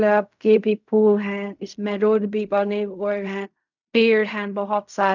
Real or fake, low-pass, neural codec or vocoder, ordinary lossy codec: fake; 7.2 kHz; codec, 16 kHz in and 24 kHz out, 0.4 kbps, LongCat-Audio-Codec, fine tuned four codebook decoder; none